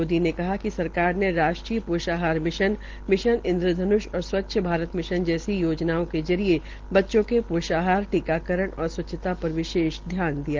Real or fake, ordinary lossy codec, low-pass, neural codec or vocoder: real; Opus, 16 kbps; 7.2 kHz; none